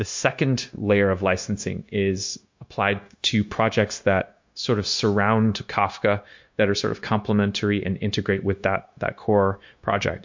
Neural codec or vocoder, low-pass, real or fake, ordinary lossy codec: codec, 16 kHz, 0.9 kbps, LongCat-Audio-Codec; 7.2 kHz; fake; MP3, 48 kbps